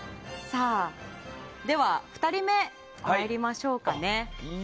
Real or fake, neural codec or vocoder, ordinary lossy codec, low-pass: real; none; none; none